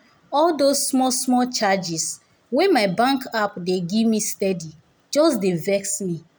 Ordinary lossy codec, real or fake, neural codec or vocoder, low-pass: none; real; none; none